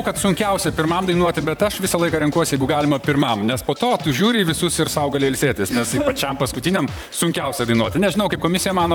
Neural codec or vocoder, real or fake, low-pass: vocoder, 44.1 kHz, 128 mel bands, Pupu-Vocoder; fake; 19.8 kHz